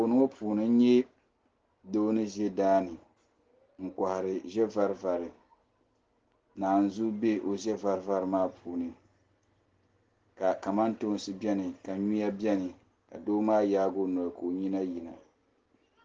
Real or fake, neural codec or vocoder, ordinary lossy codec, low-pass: real; none; Opus, 16 kbps; 7.2 kHz